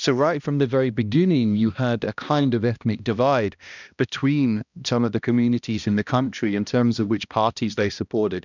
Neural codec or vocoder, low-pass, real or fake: codec, 16 kHz, 1 kbps, X-Codec, HuBERT features, trained on balanced general audio; 7.2 kHz; fake